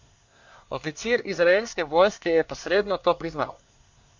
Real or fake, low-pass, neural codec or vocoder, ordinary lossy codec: fake; 7.2 kHz; codec, 24 kHz, 1 kbps, SNAC; MP3, 48 kbps